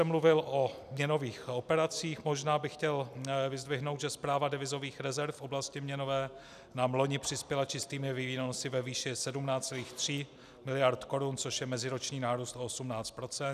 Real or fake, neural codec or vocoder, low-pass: real; none; 14.4 kHz